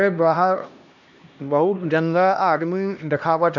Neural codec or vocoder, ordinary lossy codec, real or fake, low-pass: codec, 16 kHz, 1 kbps, X-Codec, HuBERT features, trained on LibriSpeech; none; fake; 7.2 kHz